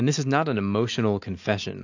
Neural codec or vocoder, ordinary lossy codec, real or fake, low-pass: none; AAC, 48 kbps; real; 7.2 kHz